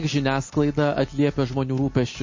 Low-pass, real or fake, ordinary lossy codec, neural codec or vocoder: 7.2 kHz; real; MP3, 32 kbps; none